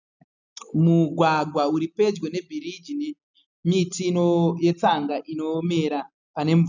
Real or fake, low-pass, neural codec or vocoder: real; 7.2 kHz; none